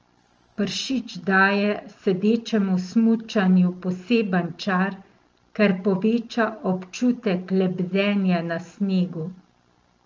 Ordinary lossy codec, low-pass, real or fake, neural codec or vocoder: Opus, 24 kbps; 7.2 kHz; real; none